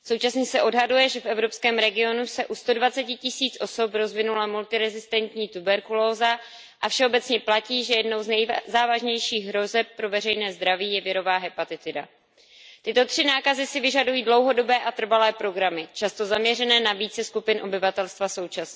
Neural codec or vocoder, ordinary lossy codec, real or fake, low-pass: none; none; real; none